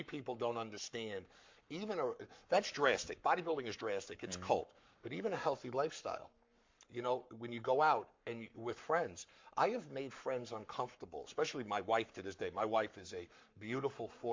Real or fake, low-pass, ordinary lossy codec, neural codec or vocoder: fake; 7.2 kHz; MP3, 48 kbps; codec, 44.1 kHz, 7.8 kbps, Pupu-Codec